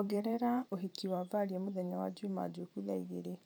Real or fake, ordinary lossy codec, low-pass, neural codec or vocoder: fake; none; none; vocoder, 44.1 kHz, 128 mel bands every 512 samples, BigVGAN v2